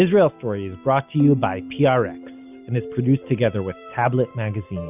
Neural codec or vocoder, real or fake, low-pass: none; real; 3.6 kHz